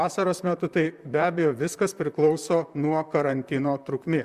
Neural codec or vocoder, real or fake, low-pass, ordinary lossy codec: vocoder, 44.1 kHz, 128 mel bands, Pupu-Vocoder; fake; 14.4 kHz; Opus, 64 kbps